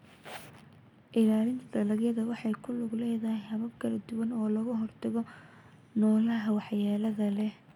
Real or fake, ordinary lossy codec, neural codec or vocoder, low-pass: real; none; none; 19.8 kHz